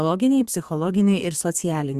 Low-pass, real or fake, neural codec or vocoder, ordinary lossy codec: 14.4 kHz; fake; codec, 32 kHz, 1.9 kbps, SNAC; Opus, 64 kbps